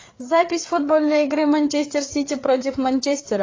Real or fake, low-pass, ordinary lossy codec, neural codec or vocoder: fake; 7.2 kHz; AAC, 32 kbps; codec, 16 kHz, 4 kbps, FreqCodec, larger model